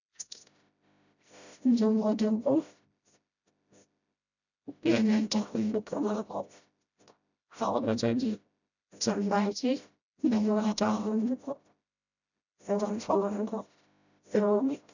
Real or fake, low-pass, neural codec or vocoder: fake; 7.2 kHz; codec, 16 kHz, 0.5 kbps, FreqCodec, smaller model